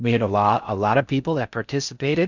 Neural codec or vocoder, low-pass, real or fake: codec, 16 kHz in and 24 kHz out, 0.6 kbps, FocalCodec, streaming, 4096 codes; 7.2 kHz; fake